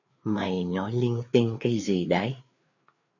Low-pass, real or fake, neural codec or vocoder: 7.2 kHz; fake; codec, 16 kHz, 4 kbps, FreqCodec, larger model